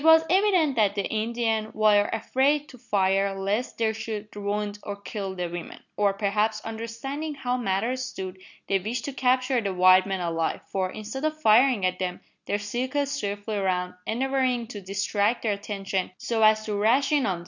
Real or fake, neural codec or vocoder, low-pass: real; none; 7.2 kHz